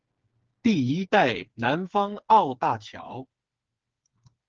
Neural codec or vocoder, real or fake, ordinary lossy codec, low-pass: codec, 16 kHz, 4 kbps, FreqCodec, smaller model; fake; Opus, 16 kbps; 7.2 kHz